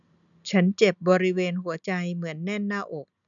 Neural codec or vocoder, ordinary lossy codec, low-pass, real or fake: none; none; 7.2 kHz; real